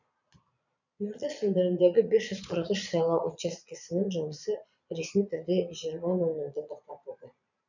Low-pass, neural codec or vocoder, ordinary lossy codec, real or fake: 7.2 kHz; vocoder, 22.05 kHz, 80 mel bands, Vocos; none; fake